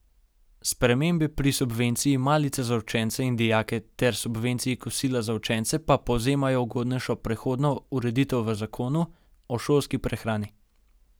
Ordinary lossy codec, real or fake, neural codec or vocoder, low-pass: none; real; none; none